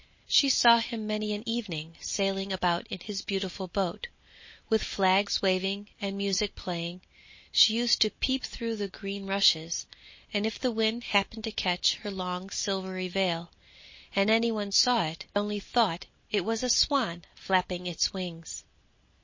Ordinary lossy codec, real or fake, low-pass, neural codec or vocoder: MP3, 32 kbps; real; 7.2 kHz; none